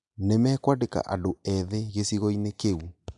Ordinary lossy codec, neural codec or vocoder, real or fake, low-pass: none; none; real; 10.8 kHz